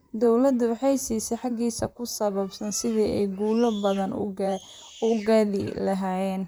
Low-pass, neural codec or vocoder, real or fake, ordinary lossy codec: none; vocoder, 44.1 kHz, 128 mel bands, Pupu-Vocoder; fake; none